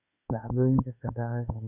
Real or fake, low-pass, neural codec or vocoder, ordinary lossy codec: fake; 3.6 kHz; autoencoder, 48 kHz, 32 numbers a frame, DAC-VAE, trained on Japanese speech; none